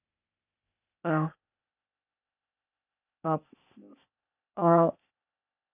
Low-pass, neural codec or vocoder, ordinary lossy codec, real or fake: 3.6 kHz; codec, 16 kHz, 0.8 kbps, ZipCodec; none; fake